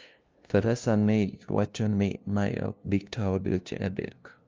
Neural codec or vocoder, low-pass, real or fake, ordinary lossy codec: codec, 16 kHz, 0.5 kbps, FunCodec, trained on LibriTTS, 25 frames a second; 7.2 kHz; fake; Opus, 24 kbps